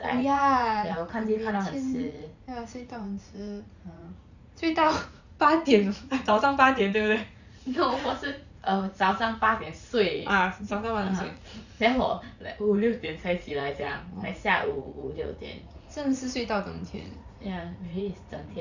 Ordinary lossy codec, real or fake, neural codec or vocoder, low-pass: none; fake; vocoder, 44.1 kHz, 80 mel bands, Vocos; 7.2 kHz